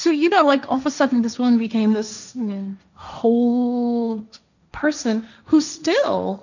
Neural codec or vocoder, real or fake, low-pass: codec, 16 kHz, 1.1 kbps, Voila-Tokenizer; fake; 7.2 kHz